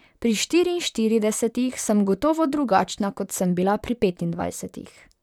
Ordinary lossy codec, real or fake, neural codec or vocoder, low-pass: none; fake; vocoder, 44.1 kHz, 128 mel bands, Pupu-Vocoder; 19.8 kHz